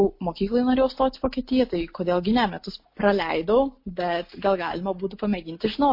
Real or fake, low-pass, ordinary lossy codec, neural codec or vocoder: real; 5.4 kHz; MP3, 32 kbps; none